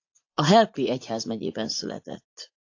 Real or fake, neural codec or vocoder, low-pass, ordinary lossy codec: fake; vocoder, 44.1 kHz, 80 mel bands, Vocos; 7.2 kHz; AAC, 48 kbps